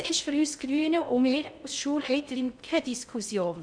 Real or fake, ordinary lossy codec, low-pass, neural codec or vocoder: fake; none; 9.9 kHz; codec, 16 kHz in and 24 kHz out, 0.6 kbps, FocalCodec, streaming, 2048 codes